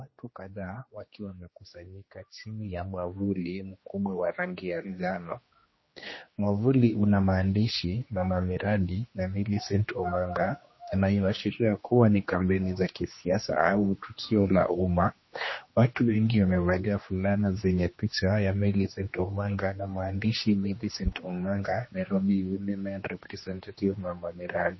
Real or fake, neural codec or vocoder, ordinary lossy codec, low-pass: fake; codec, 16 kHz, 2 kbps, X-Codec, HuBERT features, trained on general audio; MP3, 24 kbps; 7.2 kHz